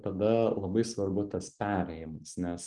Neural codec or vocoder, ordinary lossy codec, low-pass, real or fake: none; Opus, 24 kbps; 10.8 kHz; real